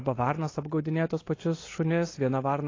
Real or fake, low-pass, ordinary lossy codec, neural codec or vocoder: real; 7.2 kHz; AAC, 32 kbps; none